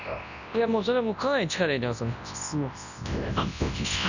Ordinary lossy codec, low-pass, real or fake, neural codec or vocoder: none; 7.2 kHz; fake; codec, 24 kHz, 0.9 kbps, WavTokenizer, large speech release